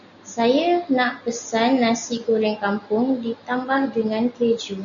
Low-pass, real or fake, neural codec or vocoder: 7.2 kHz; real; none